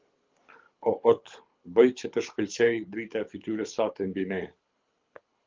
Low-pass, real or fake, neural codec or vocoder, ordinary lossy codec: 7.2 kHz; fake; codec, 24 kHz, 6 kbps, HILCodec; Opus, 32 kbps